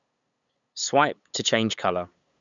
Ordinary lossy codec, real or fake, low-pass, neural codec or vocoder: none; fake; 7.2 kHz; codec, 16 kHz, 8 kbps, FunCodec, trained on LibriTTS, 25 frames a second